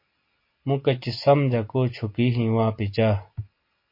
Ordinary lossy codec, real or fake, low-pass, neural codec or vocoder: MP3, 32 kbps; real; 5.4 kHz; none